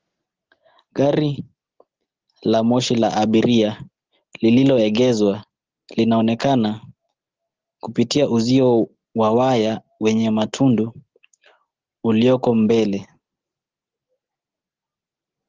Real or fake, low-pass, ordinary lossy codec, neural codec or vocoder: real; 7.2 kHz; Opus, 16 kbps; none